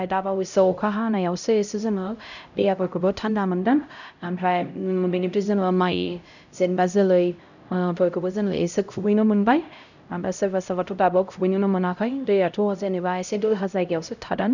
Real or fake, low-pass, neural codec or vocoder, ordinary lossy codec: fake; 7.2 kHz; codec, 16 kHz, 0.5 kbps, X-Codec, HuBERT features, trained on LibriSpeech; none